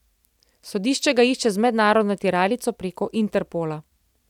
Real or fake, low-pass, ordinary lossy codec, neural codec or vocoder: real; 19.8 kHz; none; none